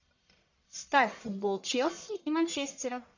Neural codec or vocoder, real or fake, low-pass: codec, 44.1 kHz, 1.7 kbps, Pupu-Codec; fake; 7.2 kHz